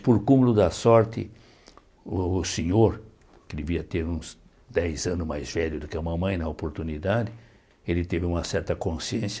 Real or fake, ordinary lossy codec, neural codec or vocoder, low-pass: real; none; none; none